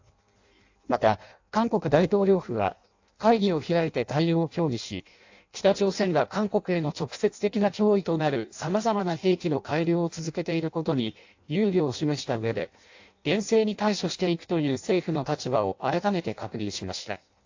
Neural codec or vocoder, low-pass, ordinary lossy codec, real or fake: codec, 16 kHz in and 24 kHz out, 0.6 kbps, FireRedTTS-2 codec; 7.2 kHz; AAC, 48 kbps; fake